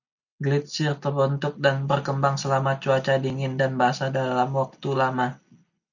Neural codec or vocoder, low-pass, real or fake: none; 7.2 kHz; real